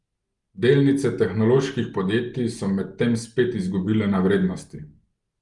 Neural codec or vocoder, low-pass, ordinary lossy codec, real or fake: none; 10.8 kHz; Opus, 32 kbps; real